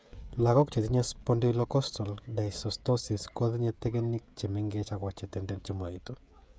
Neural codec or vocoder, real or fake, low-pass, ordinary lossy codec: codec, 16 kHz, 16 kbps, FreqCodec, smaller model; fake; none; none